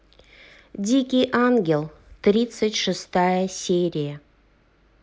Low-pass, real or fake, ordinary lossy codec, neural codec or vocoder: none; real; none; none